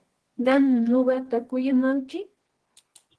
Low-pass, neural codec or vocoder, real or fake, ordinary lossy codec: 10.8 kHz; codec, 24 kHz, 0.9 kbps, WavTokenizer, medium music audio release; fake; Opus, 16 kbps